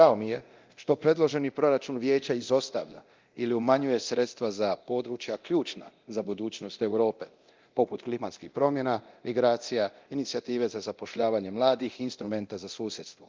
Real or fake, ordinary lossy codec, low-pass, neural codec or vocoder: fake; Opus, 32 kbps; 7.2 kHz; codec, 24 kHz, 0.9 kbps, DualCodec